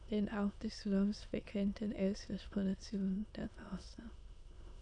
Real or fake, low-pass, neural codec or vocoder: fake; 9.9 kHz; autoencoder, 22.05 kHz, a latent of 192 numbers a frame, VITS, trained on many speakers